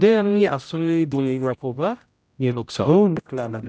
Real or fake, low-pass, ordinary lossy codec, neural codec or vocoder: fake; none; none; codec, 16 kHz, 0.5 kbps, X-Codec, HuBERT features, trained on general audio